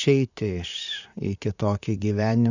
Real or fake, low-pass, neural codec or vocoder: fake; 7.2 kHz; vocoder, 44.1 kHz, 128 mel bands, Pupu-Vocoder